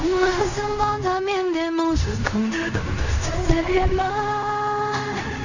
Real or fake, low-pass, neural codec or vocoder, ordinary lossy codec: fake; 7.2 kHz; codec, 16 kHz in and 24 kHz out, 0.4 kbps, LongCat-Audio-Codec, fine tuned four codebook decoder; none